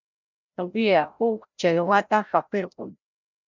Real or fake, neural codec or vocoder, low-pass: fake; codec, 16 kHz, 0.5 kbps, FreqCodec, larger model; 7.2 kHz